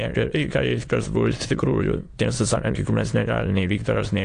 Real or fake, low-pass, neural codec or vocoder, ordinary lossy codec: fake; 9.9 kHz; autoencoder, 22.05 kHz, a latent of 192 numbers a frame, VITS, trained on many speakers; AAC, 48 kbps